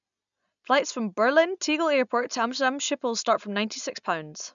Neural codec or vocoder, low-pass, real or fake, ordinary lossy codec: none; 7.2 kHz; real; none